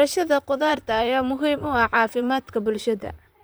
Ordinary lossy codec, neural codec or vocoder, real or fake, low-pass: none; vocoder, 44.1 kHz, 128 mel bands, Pupu-Vocoder; fake; none